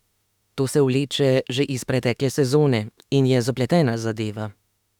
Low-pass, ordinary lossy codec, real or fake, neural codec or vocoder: 19.8 kHz; none; fake; autoencoder, 48 kHz, 32 numbers a frame, DAC-VAE, trained on Japanese speech